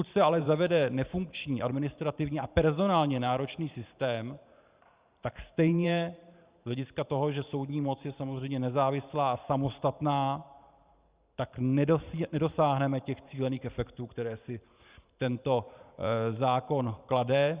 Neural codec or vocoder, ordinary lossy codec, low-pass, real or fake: vocoder, 44.1 kHz, 128 mel bands every 512 samples, BigVGAN v2; Opus, 64 kbps; 3.6 kHz; fake